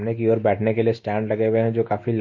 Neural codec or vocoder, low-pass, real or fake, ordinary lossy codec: none; 7.2 kHz; real; MP3, 32 kbps